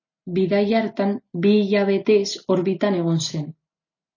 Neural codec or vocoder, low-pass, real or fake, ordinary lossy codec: none; 7.2 kHz; real; MP3, 32 kbps